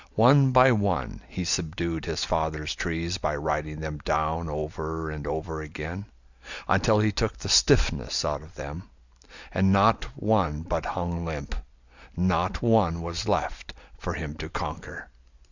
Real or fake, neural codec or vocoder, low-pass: real; none; 7.2 kHz